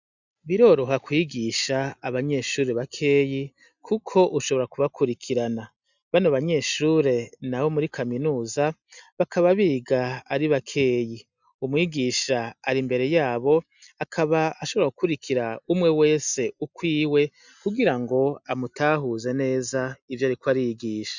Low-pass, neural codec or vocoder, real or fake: 7.2 kHz; none; real